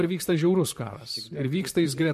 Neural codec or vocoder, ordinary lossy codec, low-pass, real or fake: vocoder, 44.1 kHz, 128 mel bands, Pupu-Vocoder; MP3, 64 kbps; 14.4 kHz; fake